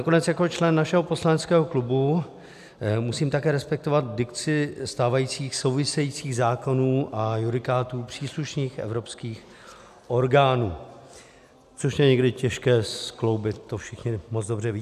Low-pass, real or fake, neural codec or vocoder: 14.4 kHz; real; none